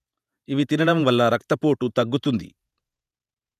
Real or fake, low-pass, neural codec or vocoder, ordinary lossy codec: fake; 14.4 kHz; vocoder, 48 kHz, 128 mel bands, Vocos; none